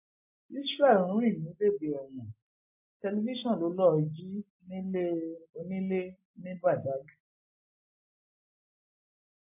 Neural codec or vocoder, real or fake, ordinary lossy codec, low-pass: none; real; MP3, 24 kbps; 3.6 kHz